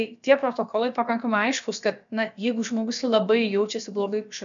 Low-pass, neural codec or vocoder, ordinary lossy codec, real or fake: 7.2 kHz; codec, 16 kHz, about 1 kbps, DyCAST, with the encoder's durations; MP3, 64 kbps; fake